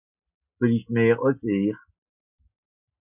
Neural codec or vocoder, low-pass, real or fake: none; 3.6 kHz; real